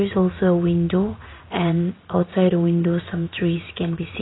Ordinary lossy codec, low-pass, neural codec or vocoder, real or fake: AAC, 16 kbps; 7.2 kHz; vocoder, 44.1 kHz, 80 mel bands, Vocos; fake